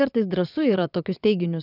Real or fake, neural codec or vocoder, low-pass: fake; vocoder, 22.05 kHz, 80 mel bands, WaveNeXt; 5.4 kHz